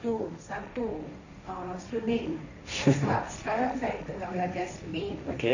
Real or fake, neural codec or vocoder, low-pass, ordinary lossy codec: fake; codec, 16 kHz, 1.1 kbps, Voila-Tokenizer; 7.2 kHz; none